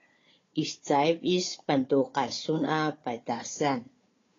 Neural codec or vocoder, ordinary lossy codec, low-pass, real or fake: codec, 16 kHz, 4 kbps, FunCodec, trained on Chinese and English, 50 frames a second; AAC, 32 kbps; 7.2 kHz; fake